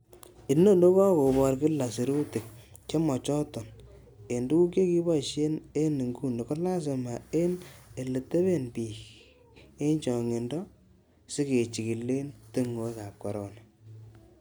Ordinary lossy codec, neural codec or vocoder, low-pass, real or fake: none; none; none; real